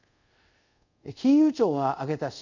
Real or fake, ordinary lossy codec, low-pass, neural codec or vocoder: fake; none; 7.2 kHz; codec, 24 kHz, 0.5 kbps, DualCodec